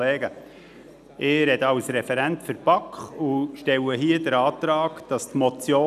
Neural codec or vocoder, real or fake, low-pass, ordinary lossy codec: none; real; 14.4 kHz; none